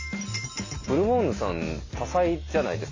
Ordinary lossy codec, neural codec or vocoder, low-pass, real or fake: AAC, 32 kbps; none; 7.2 kHz; real